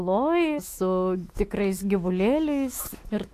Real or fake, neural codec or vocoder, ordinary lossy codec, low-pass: fake; autoencoder, 48 kHz, 128 numbers a frame, DAC-VAE, trained on Japanese speech; AAC, 64 kbps; 14.4 kHz